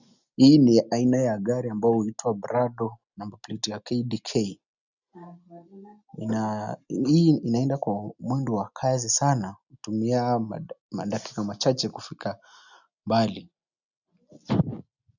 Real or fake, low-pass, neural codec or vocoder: real; 7.2 kHz; none